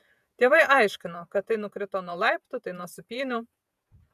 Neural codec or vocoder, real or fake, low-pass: vocoder, 48 kHz, 128 mel bands, Vocos; fake; 14.4 kHz